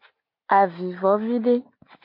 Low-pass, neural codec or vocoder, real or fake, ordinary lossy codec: 5.4 kHz; none; real; MP3, 32 kbps